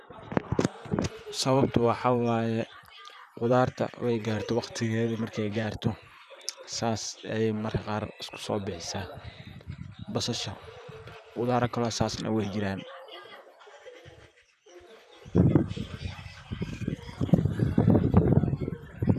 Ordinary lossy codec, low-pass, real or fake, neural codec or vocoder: none; 14.4 kHz; fake; vocoder, 44.1 kHz, 128 mel bands, Pupu-Vocoder